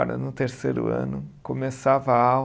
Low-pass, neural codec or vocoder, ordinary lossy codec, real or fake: none; none; none; real